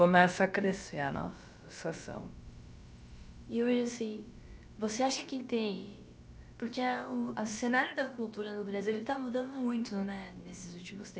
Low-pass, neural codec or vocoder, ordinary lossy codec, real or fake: none; codec, 16 kHz, about 1 kbps, DyCAST, with the encoder's durations; none; fake